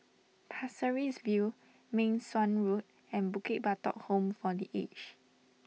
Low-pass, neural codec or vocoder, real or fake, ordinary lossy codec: none; none; real; none